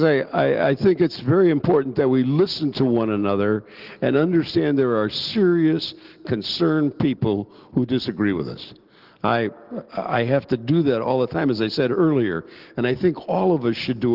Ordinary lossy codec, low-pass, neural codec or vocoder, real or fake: Opus, 16 kbps; 5.4 kHz; none; real